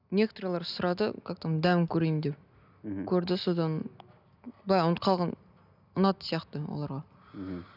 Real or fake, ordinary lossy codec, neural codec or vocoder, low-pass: real; none; none; 5.4 kHz